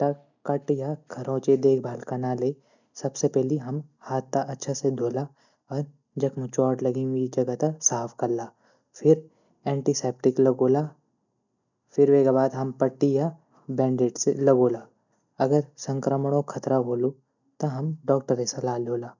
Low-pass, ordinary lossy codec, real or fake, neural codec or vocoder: 7.2 kHz; none; real; none